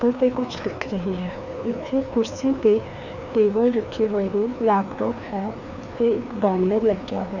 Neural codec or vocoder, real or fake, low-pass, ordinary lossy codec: codec, 16 kHz, 2 kbps, FreqCodec, larger model; fake; 7.2 kHz; none